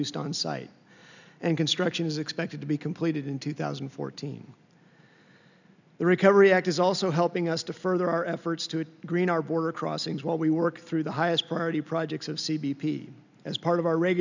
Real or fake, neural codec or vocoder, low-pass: real; none; 7.2 kHz